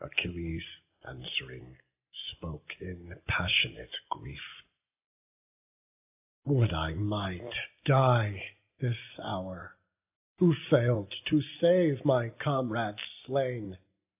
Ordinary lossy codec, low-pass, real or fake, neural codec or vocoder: AAC, 32 kbps; 3.6 kHz; real; none